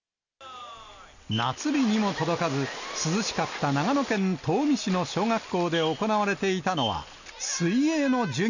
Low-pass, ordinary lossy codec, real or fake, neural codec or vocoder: 7.2 kHz; none; real; none